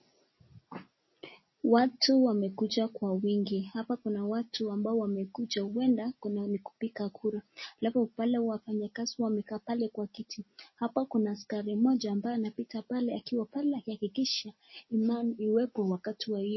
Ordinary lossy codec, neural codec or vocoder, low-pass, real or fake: MP3, 24 kbps; none; 7.2 kHz; real